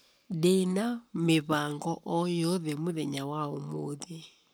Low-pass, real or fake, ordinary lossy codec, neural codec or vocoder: none; fake; none; codec, 44.1 kHz, 7.8 kbps, Pupu-Codec